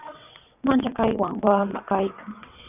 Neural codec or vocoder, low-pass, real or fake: vocoder, 22.05 kHz, 80 mel bands, WaveNeXt; 3.6 kHz; fake